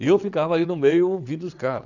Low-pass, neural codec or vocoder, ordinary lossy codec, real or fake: 7.2 kHz; vocoder, 22.05 kHz, 80 mel bands, WaveNeXt; none; fake